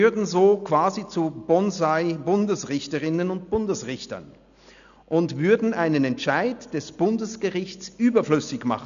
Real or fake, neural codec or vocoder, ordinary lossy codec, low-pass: real; none; none; 7.2 kHz